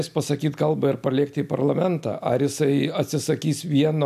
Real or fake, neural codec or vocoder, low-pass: real; none; 14.4 kHz